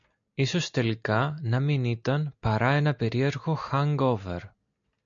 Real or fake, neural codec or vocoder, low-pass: real; none; 7.2 kHz